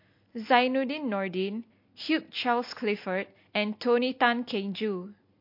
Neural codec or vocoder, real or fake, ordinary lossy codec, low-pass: none; real; MP3, 32 kbps; 5.4 kHz